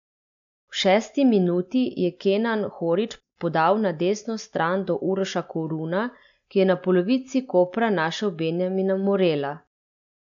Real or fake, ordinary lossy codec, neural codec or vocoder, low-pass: real; MP3, 64 kbps; none; 7.2 kHz